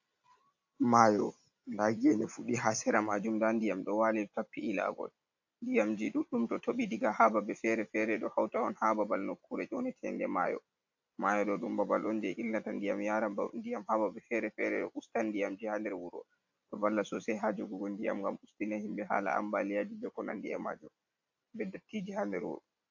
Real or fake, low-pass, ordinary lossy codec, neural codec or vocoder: real; 7.2 kHz; AAC, 48 kbps; none